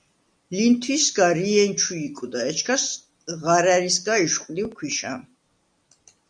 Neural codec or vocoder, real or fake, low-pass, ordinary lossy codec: none; real; 9.9 kHz; MP3, 96 kbps